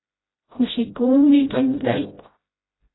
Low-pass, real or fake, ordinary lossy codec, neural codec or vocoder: 7.2 kHz; fake; AAC, 16 kbps; codec, 16 kHz, 0.5 kbps, FreqCodec, smaller model